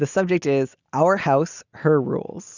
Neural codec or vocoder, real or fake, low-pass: none; real; 7.2 kHz